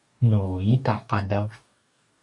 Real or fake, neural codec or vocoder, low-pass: fake; codec, 44.1 kHz, 2.6 kbps, DAC; 10.8 kHz